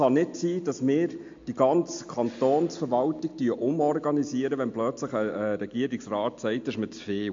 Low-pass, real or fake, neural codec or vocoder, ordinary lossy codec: 7.2 kHz; real; none; MP3, 48 kbps